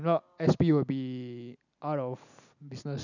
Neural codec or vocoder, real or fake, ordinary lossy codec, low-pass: none; real; none; 7.2 kHz